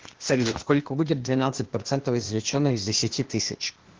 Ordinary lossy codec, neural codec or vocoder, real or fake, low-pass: Opus, 16 kbps; codec, 16 kHz, 0.8 kbps, ZipCodec; fake; 7.2 kHz